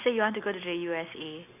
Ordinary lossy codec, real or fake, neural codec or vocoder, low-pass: none; real; none; 3.6 kHz